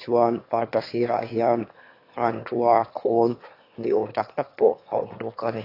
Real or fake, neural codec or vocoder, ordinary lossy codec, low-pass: fake; autoencoder, 22.05 kHz, a latent of 192 numbers a frame, VITS, trained on one speaker; AAC, 32 kbps; 5.4 kHz